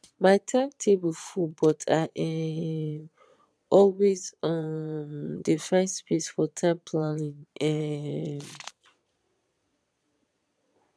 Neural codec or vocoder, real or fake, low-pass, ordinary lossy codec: vocoder, 22.05 kHz, 80 mel bands, Vocos; fake; none; none